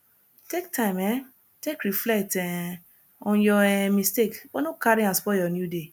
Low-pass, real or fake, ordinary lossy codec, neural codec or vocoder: none; real; none; none